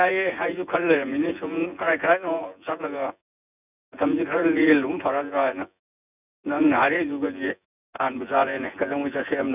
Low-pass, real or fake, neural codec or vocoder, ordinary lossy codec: 3.6 kHz; fake; vocoder, 24 kHz, 100 mel bands, Vocos; none